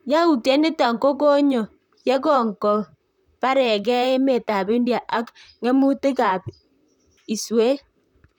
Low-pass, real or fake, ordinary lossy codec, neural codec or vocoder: 19.8 kHz; fake; none; vocoder, 44.1 kHz, 128 mel bands, Pupu-Vocoder